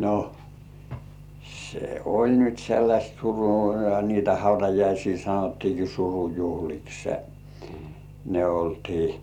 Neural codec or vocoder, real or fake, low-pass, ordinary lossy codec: vocoder, 44.1 kHz, 128 mel bands every 256 samples, BigVGAN v2; fake; 19.8 kHz; none